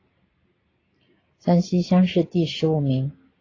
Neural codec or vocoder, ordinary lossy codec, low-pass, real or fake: none; AAC, 32 kbps; 7.2 kHz; real